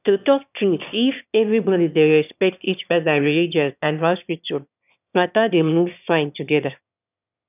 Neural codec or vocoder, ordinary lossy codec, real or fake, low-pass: autoencoder, 22.05 kHz, a latent of 192 numbers a frame, VITS, trained on one speaker; none; fake; 3.6 kHz